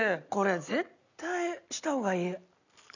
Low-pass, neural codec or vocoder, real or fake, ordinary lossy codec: 7.2 kHz; none; real; none